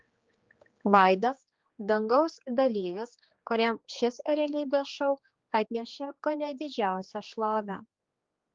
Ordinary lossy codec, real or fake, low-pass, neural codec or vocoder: Opus, 32 kbps; fake; 7.2 kHz; codec, 16 kHz, 2 kbps, X-Codec, HuBERT features, trained on general audio